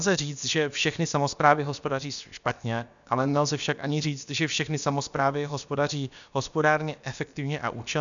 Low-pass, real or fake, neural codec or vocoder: 7.2 kHz; fake; codec, 16 kHz, about 1 kbps, DyCAST, with the encoder's durations